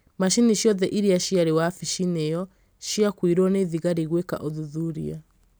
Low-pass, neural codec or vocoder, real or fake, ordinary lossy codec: none; none; real; none